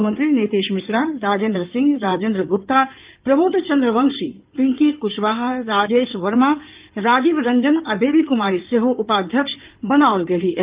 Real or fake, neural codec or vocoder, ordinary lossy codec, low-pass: fake; codec, 16 kHz in and 24 kHz out, 2.2 kbps, FireRedTTS-2 codec; Opus, 32 kbps; 3.6 kHz